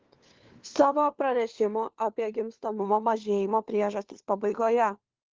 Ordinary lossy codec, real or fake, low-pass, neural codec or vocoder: Opus, 16 kbps; fake; 7.2 kHz; codec, 16 kHz, 4 kbps, FunCodec, trained on LibriTTS, 50 frames a second